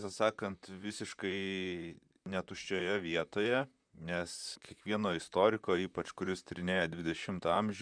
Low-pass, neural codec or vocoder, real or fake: 9.9 kHz; vocoder, 44.1 kHz, 128 mel bands, Pupu-Vocoder; fake